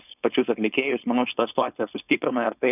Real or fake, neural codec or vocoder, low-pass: fake; codec, 16 kHz, 4.8 kbps, FACodec; 3.6 kHz